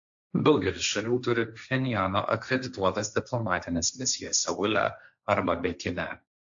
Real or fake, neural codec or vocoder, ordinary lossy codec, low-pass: fake; codec, 16 kHz, 1.1 kbps, Voila-Tokenizer; AAC, 64 kbps; 7.2 kHz